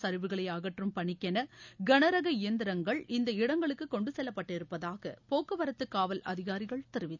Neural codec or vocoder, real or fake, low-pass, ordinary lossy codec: none; real; 7.2 kHz; none